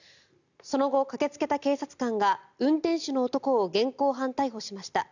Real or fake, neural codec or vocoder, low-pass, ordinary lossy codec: real; none; 7.2 kHz; none